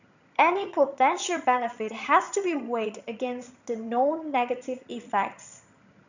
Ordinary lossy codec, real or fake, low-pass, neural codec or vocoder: none; fake; 7.2 kHz; vocoder, 22.05 kHz, 80 mel bands, HiFi-GAN